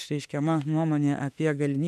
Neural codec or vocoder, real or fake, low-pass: autoencoder, 48 kHz, 32 numbers a frame, DAC-VAE, trained on Japanese speech; fake; 14.4 kHz